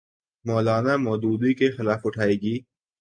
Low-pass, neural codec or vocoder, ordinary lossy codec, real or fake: 9.9 kHz; none; AAC, 64 kbps; real